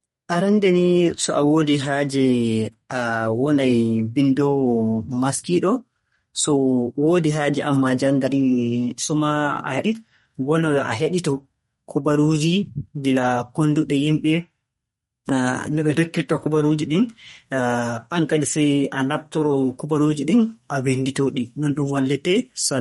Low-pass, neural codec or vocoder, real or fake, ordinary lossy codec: 14.4 kHz; codec, 32 kHz, 1.9 kbps, SNAC; fake; MP3, 48 kbps